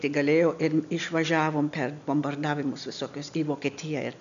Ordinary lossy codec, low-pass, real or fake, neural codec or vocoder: MP3, 96 kbps; 7.2 kHz; real; none